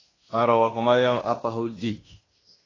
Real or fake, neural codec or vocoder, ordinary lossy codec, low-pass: fake; codec, 16 kHz in and 24 kHz out, 0.9 kbps, LongCat-Audio-Codec, fine tuned four codebook decoder; AAC, 32 kbps; 7.2 kHz